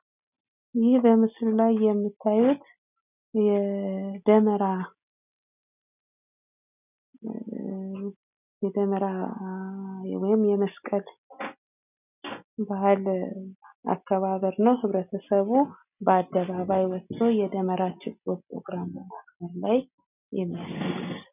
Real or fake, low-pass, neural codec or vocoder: real; 3.6 kHz; none